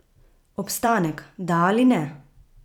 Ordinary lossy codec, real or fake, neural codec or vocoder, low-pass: none; fake; vocoder, 44.1 kHz, 128 mel bands every 256 samples, BigVGAN v2; 19.8 kHz